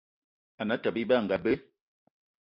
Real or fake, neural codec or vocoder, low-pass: real; none; 5.4 kHz